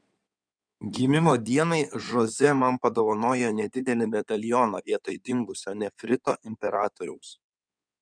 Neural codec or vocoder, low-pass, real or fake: codec, 16 kHz in and 24 kHz out, 2.2 kbps, FireRedTTS-2 codec; 9.9 kHz; fake